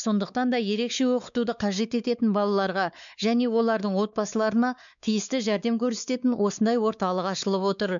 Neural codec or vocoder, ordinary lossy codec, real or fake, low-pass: codec, 16 kHz, 4 kbps, X-Codec, WavLM features, trained on Multilingual LibriSpeech; none; fake; 7.2 kHz